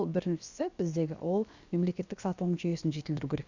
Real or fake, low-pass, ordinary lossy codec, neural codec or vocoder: fake; 7.2 kHz; Opus, 64 kbps; codec, 16 kHz, about 1 kbps, DyCAST, with the encoder's durations